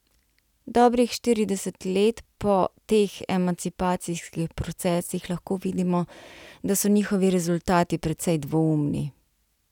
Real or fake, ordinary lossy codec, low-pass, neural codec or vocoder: real; none; 19.8 kHz; none